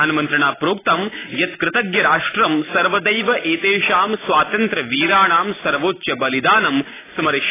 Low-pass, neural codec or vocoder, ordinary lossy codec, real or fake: 3.6 kHz; none; AAC, 16 kbps; real